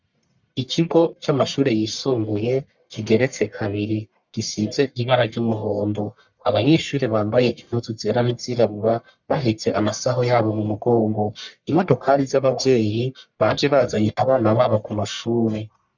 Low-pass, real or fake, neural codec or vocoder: 7.2 kHz; fake; codec, 44.1 kHz, 1.7 kbps, Pupu-Codec